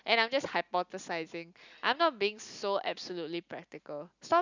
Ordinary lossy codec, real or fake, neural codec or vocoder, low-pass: none; real; none; 7.2 kHz